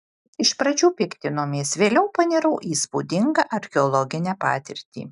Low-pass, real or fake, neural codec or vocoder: 10.8 kHz; real; none